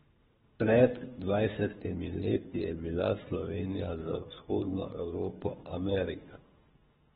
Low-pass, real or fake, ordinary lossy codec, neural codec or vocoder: 10.8 kHz; fake; AAC, 16 kbps; codec, 24 kHz, 3 kbps, HILCodec